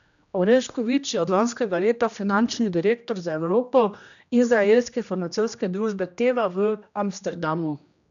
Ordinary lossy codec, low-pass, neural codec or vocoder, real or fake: none; 7.2 kHz; codec, 16 kHz, 1 kbps, X-Codec, HuBERT features, trained on general audio; fake